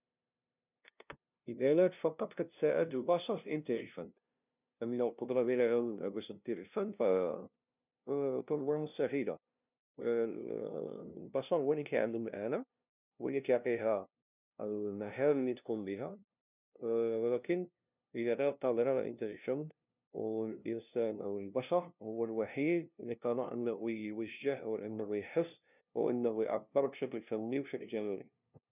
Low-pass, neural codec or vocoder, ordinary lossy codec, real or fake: 3.6 kHz; codec, 16 kHz, 0.5 kbps, FunCodec, trained on LibriTTS, 25 frames a second; none; fake